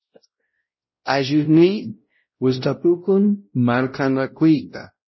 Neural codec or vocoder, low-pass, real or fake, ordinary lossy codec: codec, 16 kHz, 0.5 kbps, X-Codec, WavLM features, trained on Multilingual LibriSpeech; 7.2 kHz; fake; MP3, 24 kbps